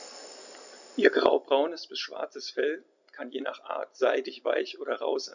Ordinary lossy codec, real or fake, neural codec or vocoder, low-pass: none; real; none; 7.2 kHz